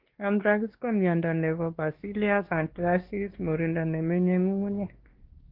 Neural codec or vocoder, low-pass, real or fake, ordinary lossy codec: codec, 16 kHz, 2 kbps, X-Codec, WavLM features, trained on Multilingual LibriSpeech; 5.4 kHz; fake; Opus, 16 kbps